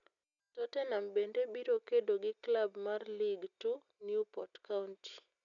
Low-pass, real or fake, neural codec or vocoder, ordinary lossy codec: 7.2 kHz; real; none; none